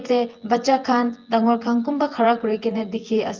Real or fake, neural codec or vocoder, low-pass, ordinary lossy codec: fake; vocoder, 24 kHz, 100 mel bands, Vocos; 7.2 kHz; Opus, 32 kbps